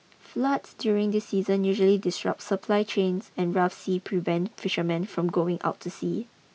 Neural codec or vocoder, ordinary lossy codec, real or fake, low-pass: none; none; real; none